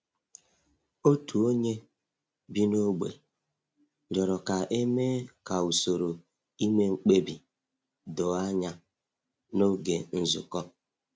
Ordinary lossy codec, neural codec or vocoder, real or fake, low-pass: none; none; real; none